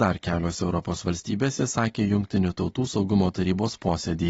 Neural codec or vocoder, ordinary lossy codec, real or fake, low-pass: none; AAC, 24 kbps; real; 14.4 kHz